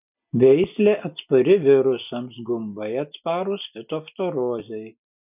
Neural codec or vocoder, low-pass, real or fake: none; 3.6 kHz; real